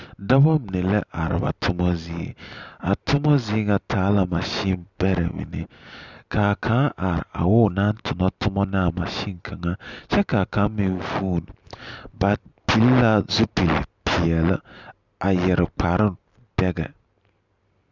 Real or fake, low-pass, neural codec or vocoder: real; 7.2 kHz; none